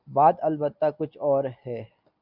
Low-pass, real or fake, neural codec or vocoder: 5.4 kHz; real; none